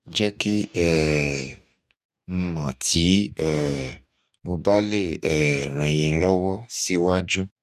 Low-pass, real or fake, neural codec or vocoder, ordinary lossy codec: 14.4 kHz; fake; codec, 44.1 kHz, 2.6 kbps, DAC; none